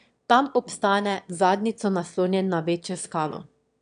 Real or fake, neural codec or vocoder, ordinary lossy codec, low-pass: fake; autoencoder, 22.05 kHz, a latent of 192 numbers a frame, VITS, trained on one speaker; none; 9.9 kHz